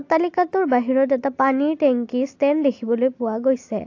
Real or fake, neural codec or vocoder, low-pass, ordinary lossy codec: real; none; 7.2 kHz; AAC, 48 kbps